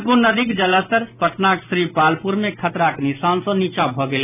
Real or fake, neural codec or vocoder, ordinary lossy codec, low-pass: real; none; none; 3.6 kHz